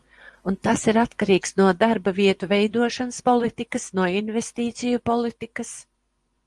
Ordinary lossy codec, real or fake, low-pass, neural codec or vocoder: Opus, 24 kbps; fake; 10.8 kHz; vocoder, 24 kHz, 100 mel bands, Vocos